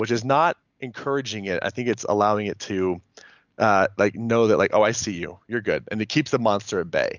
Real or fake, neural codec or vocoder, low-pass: real; none; 7.2 kHz